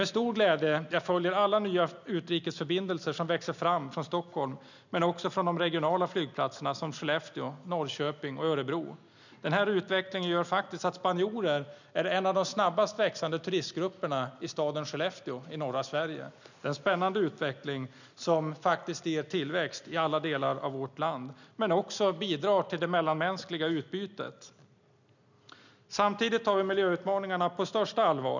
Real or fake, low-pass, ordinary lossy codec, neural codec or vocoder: real; 7.2 kHz; none; none